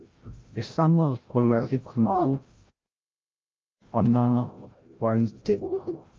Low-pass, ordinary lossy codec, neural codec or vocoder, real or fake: 7.2 kHz; Opus, 24 kbps; codec, 16 kHz, 0.5 kbps, FreqCodec, larger model; fake